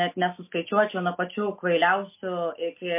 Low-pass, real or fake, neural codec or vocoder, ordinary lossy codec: 3.6 kHz; real; none; MP3, 24 kbps